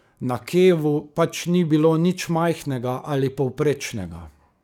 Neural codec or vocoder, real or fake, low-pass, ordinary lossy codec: codec, 44.1 kHz, 7.8 kbps, DAC; fake; 19.8 kHz; none